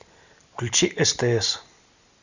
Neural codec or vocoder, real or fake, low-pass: none; real; 7.2 kHz